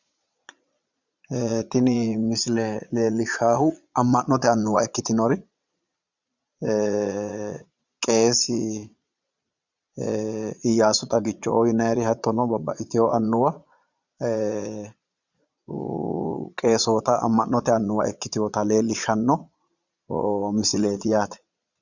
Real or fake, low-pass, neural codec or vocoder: fake; 7.2 kHz; vocoder, 22.05 kHz, 80 mel bands, Vocos